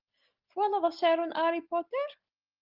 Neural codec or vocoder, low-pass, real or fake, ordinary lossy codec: none; 5.4 kHz; real; Opus, 32 kbps